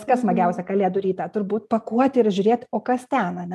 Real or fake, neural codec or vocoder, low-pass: real; none; 14.4 kHz